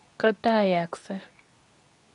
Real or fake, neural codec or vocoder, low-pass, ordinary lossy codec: fake; codec, 24 kHz, 0.9 kbps, WavTokenizer, medium speech release version 2; 10.8 kHz; none